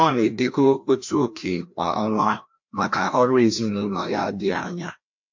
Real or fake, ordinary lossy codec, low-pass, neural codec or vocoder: fake; MP3, 48 kbps; 7.2 kHz; codec, 16 kHz, 1 kbps, FreqCodec, larger model